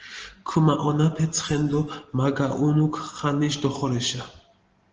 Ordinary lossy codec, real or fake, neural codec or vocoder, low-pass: Opus, 16 kbps; real; none; 7.2 kHz